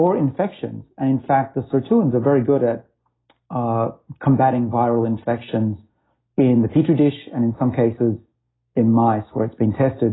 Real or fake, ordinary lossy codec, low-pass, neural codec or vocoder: real; AAC, 16 kbps; 7.2 kHz; none